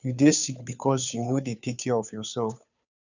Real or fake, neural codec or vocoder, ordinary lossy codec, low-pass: fake; codec, 16 kHz, 2 kbps, FunCodec, trained on Chinese and English, 25 frames a second; none; 7.2 kHz